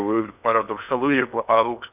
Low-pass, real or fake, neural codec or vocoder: 3.6 kHz; fake; codec, 16 kHz in and 24 kHz out, 0.6 kbps, FocalCodec, streaming, 4096 codes